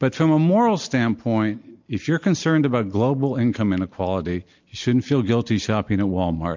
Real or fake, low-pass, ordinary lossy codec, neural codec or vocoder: real; 7.2 kHz; MP3, 64 kbps; none